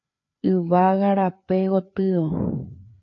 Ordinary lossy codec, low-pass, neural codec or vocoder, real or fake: AAC, 48 kbps; 7.2 kHz; codec, 16 kHz, 4 kbps, FreqCodec, larger model; fake